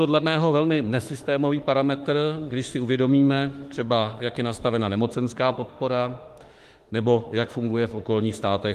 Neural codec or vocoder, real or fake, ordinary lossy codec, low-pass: autoencoder, 48 kHz, 32 numbers a frame, DAC-VAE, trained on Japanese speech; fake; Opus, 24 kbps; 14.4 kHz